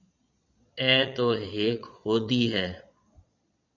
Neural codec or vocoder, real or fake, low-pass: vocoder, 22.05 kHz, 80 mel bands, Vocos; fake; 7.2 kHz